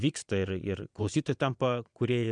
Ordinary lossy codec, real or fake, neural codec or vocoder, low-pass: MP3, 96 kbps; fake; vocoder, 22.05 kHz, 80 mel bands, Vocos; 9.9 kHz